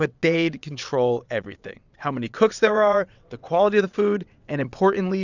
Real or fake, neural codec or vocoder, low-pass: fake; vocoder, 22.05 kHz, 80 mel bands, WaveNeXt; 7.2 kHz